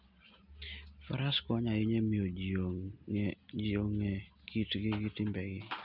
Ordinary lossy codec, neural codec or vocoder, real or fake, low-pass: none; none; real; 5.4 kHz